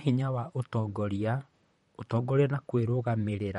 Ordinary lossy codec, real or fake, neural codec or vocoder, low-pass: MP3, 48 kbps; fake; vocoder, 44.1 kHz, 128 mel bands, Pupu-Vocoder; 19.8 kHz